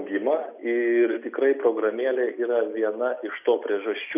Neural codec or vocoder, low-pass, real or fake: none; 3.6 kHz; real